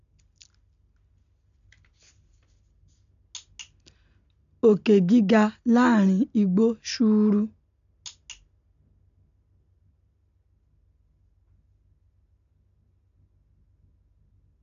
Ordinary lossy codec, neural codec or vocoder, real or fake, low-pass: none; none; real; 7.2 kHz